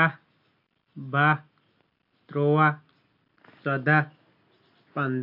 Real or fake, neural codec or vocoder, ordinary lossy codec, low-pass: real; none; MP3, 48 kbps; 5.4 kHz